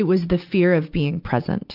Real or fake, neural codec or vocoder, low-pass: real; none; 5.4 kHz